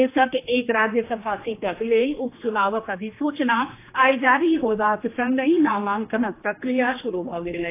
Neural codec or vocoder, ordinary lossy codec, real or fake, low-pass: codec, 16 kHz, 2 kbps, X-Codec, HuBERT features, trained on general audio; AAC, 24 kbps; fake; 3.6 kHz